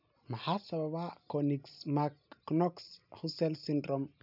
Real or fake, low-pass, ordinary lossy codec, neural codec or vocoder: real; 5.4 kHz; none; none